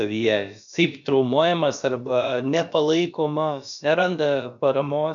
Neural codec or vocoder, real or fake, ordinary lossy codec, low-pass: codec, 16 kHz, about 1 kbps, DyCAST, with the encoder's durations; fake; MP3, 96 kbps; 7.2 kHz